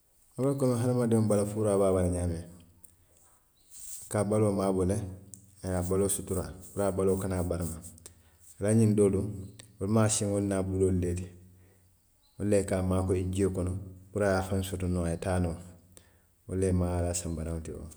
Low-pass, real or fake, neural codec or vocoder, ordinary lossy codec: none; real; none; none